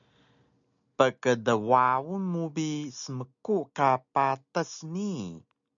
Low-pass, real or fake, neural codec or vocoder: 7.2 kHz; real; none